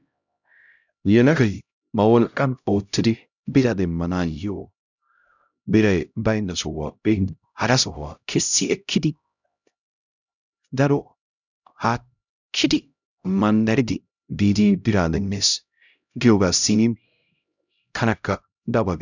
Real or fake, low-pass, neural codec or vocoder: fake; 7.2 kHz; codec, 16 kHz, 0.5 kbps, X-Codec, HuBERT features, trained on LibriSpeech